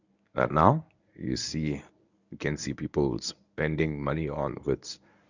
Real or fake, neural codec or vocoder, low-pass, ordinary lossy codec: fake; codec, 24 kHz, 0.9 kbps, WavTokenizer, medium speech release version 1; 7.2 kHz; none